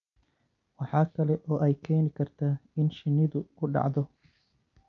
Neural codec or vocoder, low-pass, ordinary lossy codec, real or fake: none; 7.2 kHz; none; real